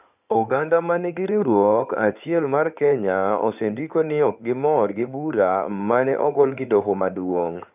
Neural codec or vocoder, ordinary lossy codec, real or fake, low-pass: codec, 16 kHz in and 24 kHz out, 2.2 kbps, FireRedTTS-2 codec; none; fake; 3.6 kHz